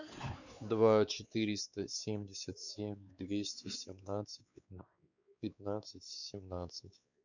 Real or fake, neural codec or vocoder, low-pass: fake; codec, 16 kHz, 4 kbps, X-Codec, WavLM features, trained on Multilingual LibriSpeech; 7.2 kHz